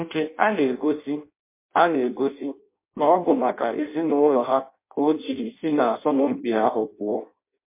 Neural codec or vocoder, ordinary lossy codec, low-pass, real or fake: codec, 16 kHz in and 24 kHz out, 0.6 kbps, FireRedTTS-2 codec; MP3, 24 kbps; 3.6 kHz; fake